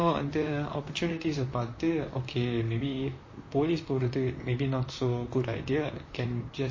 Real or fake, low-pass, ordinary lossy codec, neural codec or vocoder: fake; 7.2 kHz; MP3, 32 kbps; vocoder, 44.1 kHz, 128 mel bands, Pupu-Vocoder